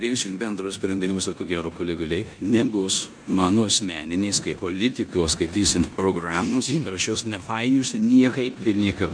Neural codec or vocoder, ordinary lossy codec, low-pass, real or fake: codec, 16 kHz in and 24 kHz out, 0.9 kbps, LongCat-Audio-Codec, four codebook decoder; MP3, 96 kbps; 9.9 kHz; fake